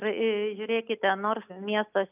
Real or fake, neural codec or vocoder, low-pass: real; none; 3.6 kHz